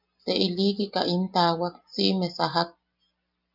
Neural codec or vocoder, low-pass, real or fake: none; 5.4 kHz; real